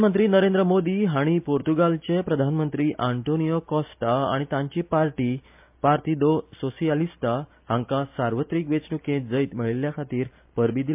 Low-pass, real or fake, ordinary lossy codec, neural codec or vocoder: 3.6 kHz; real; MP3, 32 kbps; none